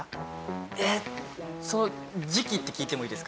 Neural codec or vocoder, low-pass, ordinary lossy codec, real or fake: none; none; none; real